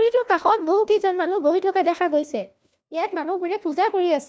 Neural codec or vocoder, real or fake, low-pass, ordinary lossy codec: codec, 16 kHz, 1 kbps, FunCodec, trained on LibriTTS, 50 frames a second; fake; none; none